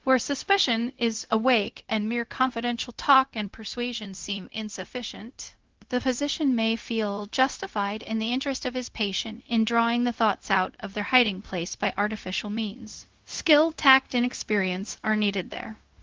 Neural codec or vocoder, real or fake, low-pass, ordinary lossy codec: codec, 16 kHz, 0.4 kbps, LongCat-Audio-Codec; fake; 7.2 kHz; Opus, 16 kbps